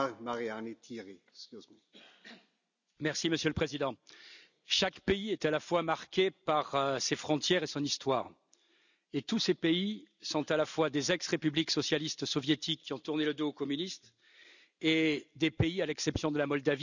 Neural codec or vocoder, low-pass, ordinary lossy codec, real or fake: none; 7.2 kHz; none; real